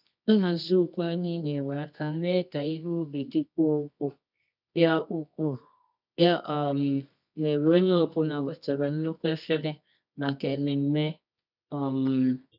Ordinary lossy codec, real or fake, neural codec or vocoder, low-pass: none; fake; codec, 24 kHz, 0.9 kbps, WavTokenizer, medium music audio release; 5.4 kHz